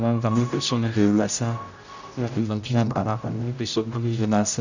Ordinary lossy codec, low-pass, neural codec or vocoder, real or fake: none; 7.2 kHz; codec, 16 kHz, 0.5 kbps, X-Codec, HuBERT features, trained on general audio; fake